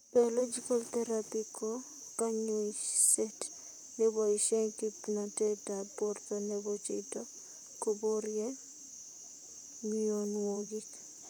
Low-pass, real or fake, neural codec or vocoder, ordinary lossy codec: none; fake; vocoder, 44.1 kHz, 128 mel bands, Pupu-Vocoder; none